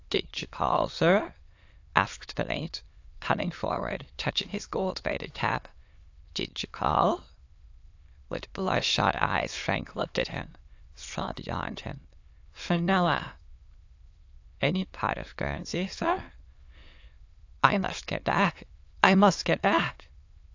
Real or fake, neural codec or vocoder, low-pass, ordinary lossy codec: fake; autoencoder, 22.05 kHz, a latent of 192 numbers a frame, VITS, trained on many speakers; 7.2 kHz; AAC, 48 kbps